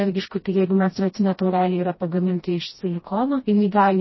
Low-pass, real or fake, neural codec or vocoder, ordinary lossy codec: 7.2 kHz; fake; codec, 16 kHz, 1 kbps, FreqCodec, smaller model; MP3, 24 kbps